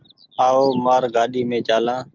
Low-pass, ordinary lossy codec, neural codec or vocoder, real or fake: 7.2 kHz; Opus, 32 kbps; none; real